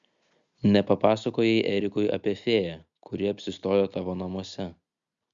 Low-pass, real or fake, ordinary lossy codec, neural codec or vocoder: 7.2 kHz; real; Opus, 64 kbps; none